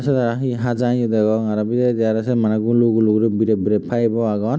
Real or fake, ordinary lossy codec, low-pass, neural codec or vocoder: real; none; none; none